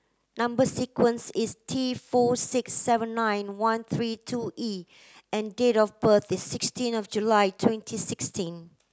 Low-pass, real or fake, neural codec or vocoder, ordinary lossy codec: none; real; none; none